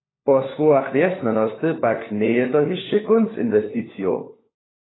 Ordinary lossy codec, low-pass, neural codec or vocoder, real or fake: AAC, 16 kbps; 7.2 kHz; codec, 16 kHz, 4 kbps, FunCodec, trained on LibriTTS, 50 frames a second; fake